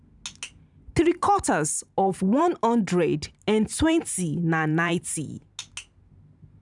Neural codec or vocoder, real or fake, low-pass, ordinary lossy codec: vocoder, 44.1 kHz, 128 mel bands every 512 samples, BigVGAN v2; fake; 10.8 kHz; none